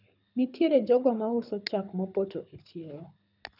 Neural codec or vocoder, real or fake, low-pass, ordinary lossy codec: codec, 24 kHz, 6 kbps, HILCodec; fake; 5.4 kHz; none